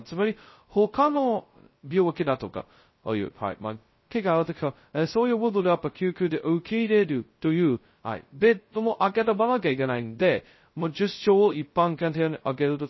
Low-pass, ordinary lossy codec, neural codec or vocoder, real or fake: 7.2 kHz; MP3, 24 kbps; codec, 16 kHz, 0.2 kbps, FocalCodec; fake